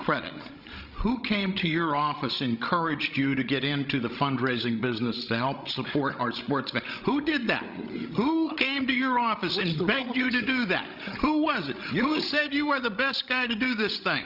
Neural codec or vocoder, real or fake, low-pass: codec, 16 kHz, 16 kbps, FreqCodec, larger model; fake; 5.4 kHz